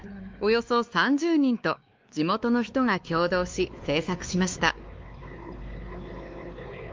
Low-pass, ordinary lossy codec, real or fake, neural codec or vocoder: 7.2 kHz; Opus, 24 kbps; fake; codec, 16 kHz, 2 kbps, X-Codec, WavLM features, trained on Multilingual LibriSpeech